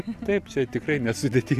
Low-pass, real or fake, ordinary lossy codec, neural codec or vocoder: 14.4 kHz; real; AAC, 96 kbps; none